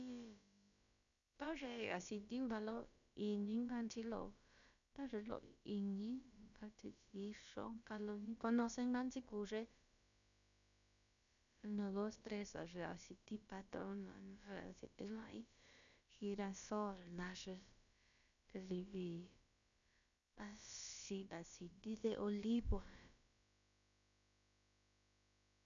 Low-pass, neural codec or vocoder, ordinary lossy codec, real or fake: 7.2 kHz; codec, 16 kHz, about 1 kbps, DyCAST, with the encoder's durations; none; fake